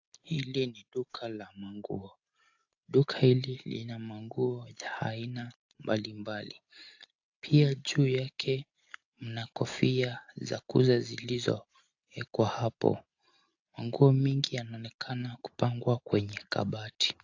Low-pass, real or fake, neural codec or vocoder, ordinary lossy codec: 7.2 kHz; real; none; AAC, 48 kbps